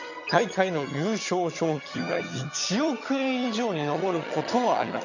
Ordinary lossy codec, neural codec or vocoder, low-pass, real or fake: none; vocoder, 22.05 kHz, 80 mel bands, HiFi-GAN; 7.2 kHz; fake